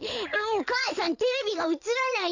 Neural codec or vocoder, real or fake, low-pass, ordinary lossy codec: codec, 16 kHz in and 24 kHz out, 2.2 kbps, FireRedTTS-2 codec; fake; 7.2 kHz; none